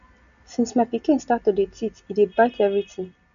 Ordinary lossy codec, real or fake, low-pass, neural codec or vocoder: none; real; 7.2 kHz; none